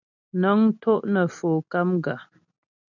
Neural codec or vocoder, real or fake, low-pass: none; real; 7.2 kHz